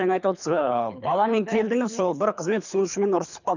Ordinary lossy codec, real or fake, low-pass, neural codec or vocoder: none; fake; 7.2 kHz; codec, 24 kHz, 3 kbps, HILCodec